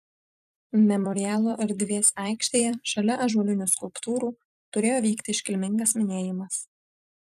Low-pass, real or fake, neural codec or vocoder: 14.4 kHz; fake; vocoder, 44.1 kHz, 128 mel bands every 256 samples, BigVGAN v2